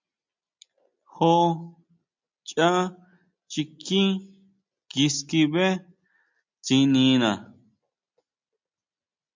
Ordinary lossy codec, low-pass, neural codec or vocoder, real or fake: MP3, 64 kbps; 7.2 kHz; none; real